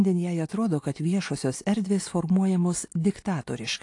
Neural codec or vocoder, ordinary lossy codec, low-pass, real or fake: none; AAC, 48 kbps; 10.8 kHz; real